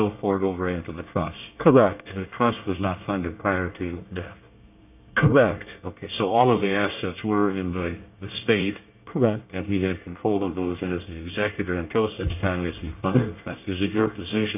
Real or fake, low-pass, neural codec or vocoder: fake; 3.6 kHz; codec, 24 kHz, 1 kbps, SNAC